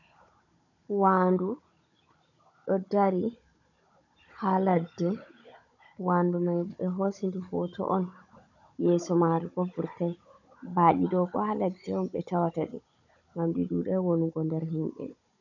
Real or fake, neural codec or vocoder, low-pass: fake; codec, 16 kHz, 16 kbps, FunCodec, trained on Chinese and English, 50 frames a second; 7.2 kHz